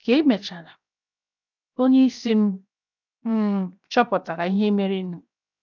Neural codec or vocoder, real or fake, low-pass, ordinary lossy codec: codec, 16 kHz, 0.7 kbps, FocalCodec; fake; 7.2 kHz; none